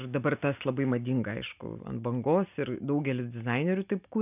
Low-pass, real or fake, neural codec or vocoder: 3.6 kHz; real; none